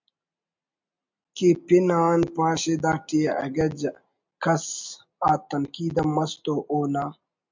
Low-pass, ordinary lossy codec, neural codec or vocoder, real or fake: 7.2 kHz; MP3, 64 kbps; none; real